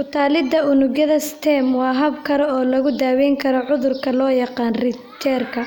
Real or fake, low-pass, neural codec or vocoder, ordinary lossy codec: real; 19.8 kHz; none; none